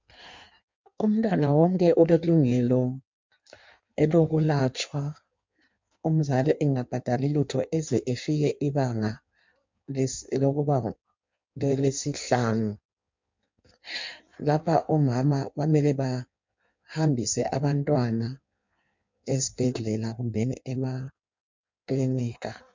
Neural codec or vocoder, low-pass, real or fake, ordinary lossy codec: codec, 16 kHz in and 24 kHz out, 1.1 kbps, FireRedTTS-2 codec; 7.2 kHz; fake; MP3, 64 kbps